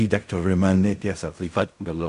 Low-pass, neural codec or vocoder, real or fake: 10.8 kHz; codec, 16 kHz in and 24 kHz out, 0.4 kbps, LongCat-Audio-Codec, fine tuned four codebook decoder; fake